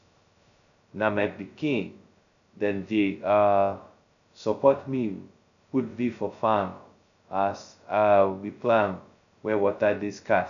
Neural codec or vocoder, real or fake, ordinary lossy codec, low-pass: codec, 16 kHz, 0.2 kbps, FocalCodec; fake; none; 7.2 kHz